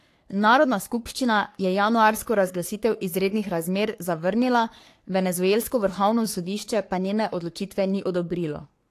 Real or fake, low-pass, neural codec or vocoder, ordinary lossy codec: fake; 14.4 kHz; codec, 44.1 kHz, 3.4 kbps, Pupu-Codec; AAC, 64 kbps